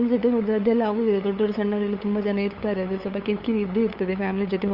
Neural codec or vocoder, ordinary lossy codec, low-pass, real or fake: codec, 16 kHz, 8 kbps, FunCodec, trained on LibriTTS, 25 frames a second; Opus, 24 kbps; 5.4 kHz; fake